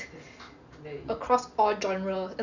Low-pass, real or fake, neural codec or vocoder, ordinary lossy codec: 7.2 kHz; real; none; none